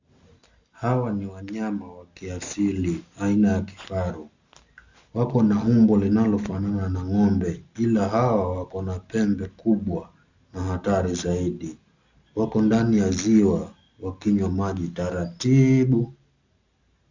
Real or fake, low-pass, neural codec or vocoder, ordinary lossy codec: real; 7.2 kHz; none; Opus, 64 kbps